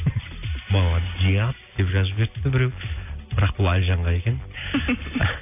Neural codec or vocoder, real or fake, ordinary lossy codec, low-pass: none; real; AAC, 32 kbps; 3.6 kHz